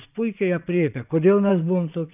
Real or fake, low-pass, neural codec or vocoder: fake; 3.6 kHz; vocoder, 22.05 kHz, 80 mel bands, Vocos